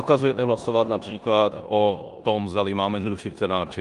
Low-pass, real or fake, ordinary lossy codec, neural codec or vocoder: 10.8 kHz; fake; Opus, 32 kbps; codec, 16 kHz in and 24 kHz out, 0.9 kbps, LongCat-Audio-Codec, four codebook decoder